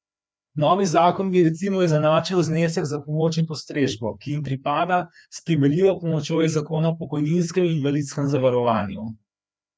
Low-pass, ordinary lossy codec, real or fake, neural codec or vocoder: none; none; fake; codec, 16 kHz, 2 kbps, FreqCodec, larger model